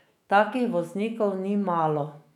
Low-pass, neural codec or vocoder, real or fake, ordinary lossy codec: 19.8 kHz; autoencoder, 48 kHz, 128 numbers a frame, DAC-VAE, trained on Japanese speech; fake; none